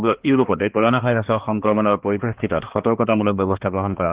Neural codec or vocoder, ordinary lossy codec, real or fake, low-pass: codec, 16 kHz, 2 kbps, X-Codec, HuBERT features, trained on balanced general audio; Opus, 16 kbps; fake; 3.6 kHz